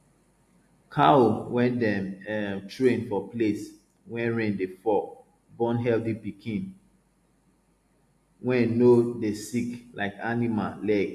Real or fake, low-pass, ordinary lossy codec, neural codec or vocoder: real; 14.4 kHz; AAC, 64 kbps; none